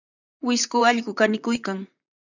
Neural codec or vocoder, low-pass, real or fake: vocoder, 44.1 kHz, 128 mel bands, Pupu-Vocoder; 7.2 kHz; fake